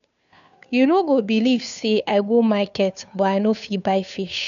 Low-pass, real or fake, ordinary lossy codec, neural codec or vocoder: 7.2 kHz; fake; none; codec, 16 kHz, 2 kbps, FunCodec, trained on Chinese and English, 25 frames a second